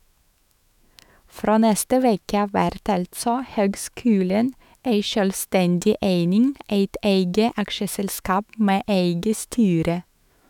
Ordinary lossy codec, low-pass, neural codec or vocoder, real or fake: none; 19.8 kHz; autoencoder, 48 kHz, 128 numbers a frame, DAC-VAE, trained on Japanese speech; fake